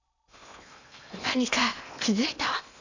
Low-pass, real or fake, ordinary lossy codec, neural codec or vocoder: 7.2 kHz; fake; none; codec, 16 kHz in and 24 kHz out, 0.8 kbps, FocalCodec, streaming, 65536 codes